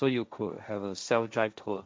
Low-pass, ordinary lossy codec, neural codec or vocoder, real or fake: none; none; codec, 16 kHz, 1.1 kbps, Voila-Tokenizer; fake